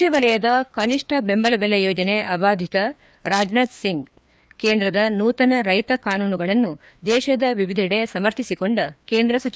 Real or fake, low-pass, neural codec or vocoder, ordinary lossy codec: fake; none; codec, 16 kHz, 2 kbps, FreqCodec, larger model; none